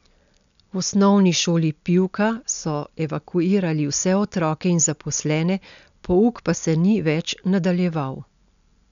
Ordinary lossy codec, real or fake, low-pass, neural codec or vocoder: none; real; 7.2 kHz; none